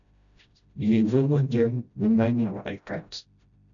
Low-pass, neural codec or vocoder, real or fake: 7.2 kHz; codec, 16 kHz, 0.5 kbps, FreqCodec, smaller model; fake